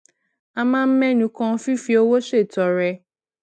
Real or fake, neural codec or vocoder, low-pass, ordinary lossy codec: real; none; none; none